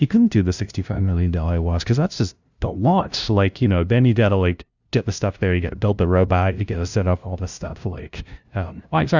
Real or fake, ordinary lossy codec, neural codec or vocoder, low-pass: fake; Opus, 64 kbps; codec, 16 kHz, 0.5 kbps, FunCodec, trained on LibriTTS, 25 frames a second; 7.2 kHz